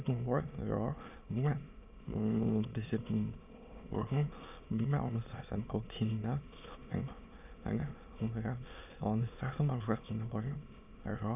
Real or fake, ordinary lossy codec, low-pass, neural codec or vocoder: fake; none; 3.6 kHz; autoencoder, 22.05 kHz, a latent of 192 numbers a frame, VITS, trained on many speakers